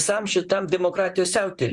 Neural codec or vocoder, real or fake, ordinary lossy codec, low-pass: vocoder, 44.1 kHz, 128 mel bands, Pupu-Vocoder; fake; Opus, 64 kbps; 10.8 kHz